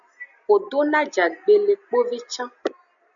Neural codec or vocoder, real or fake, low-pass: none; real; 7.2 kHz